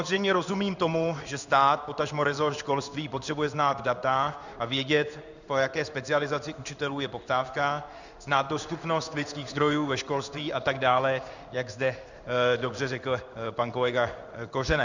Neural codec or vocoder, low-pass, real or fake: codec, 16 kHz in and 24 kHz out, 1 kbps, XY-Tokenizer; 7.2 kHz; fake